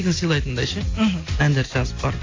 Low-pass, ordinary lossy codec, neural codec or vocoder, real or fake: 7.2 kHz; AAC, 32 kbps; none; real